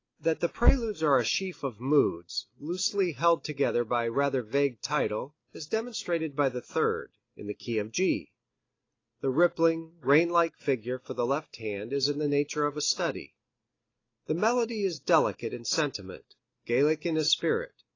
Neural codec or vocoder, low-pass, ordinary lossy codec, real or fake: none; 7.2 kHz; AAC, 32 kbps; real